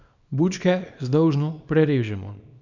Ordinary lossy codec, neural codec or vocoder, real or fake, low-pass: none; codec, 24 kHz, 0.9 kbps, WavTokenizer, small release; fake; 7.2 kHz